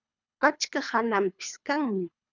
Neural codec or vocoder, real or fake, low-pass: codec, 24 kHz, 3 kbps, HILCodec; fake; 7.2 kHz